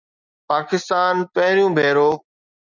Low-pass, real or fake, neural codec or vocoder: 7.2 kHz; real; none